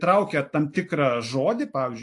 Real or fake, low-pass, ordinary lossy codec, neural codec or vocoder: real; 10.8 kHz; AAC, 48 kbps; none